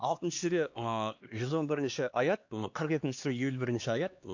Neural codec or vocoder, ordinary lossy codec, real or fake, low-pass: codec, 16 kHz, 2 kbps, X-Codec, HuBERT features, trained on LibriSpeech; AAC, 48 kbps; fake; 7.2 kHz